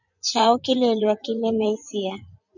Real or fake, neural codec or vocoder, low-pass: fake; vocoder, 24 kHz, 100 mel bands, Vocos; 7.2 kHz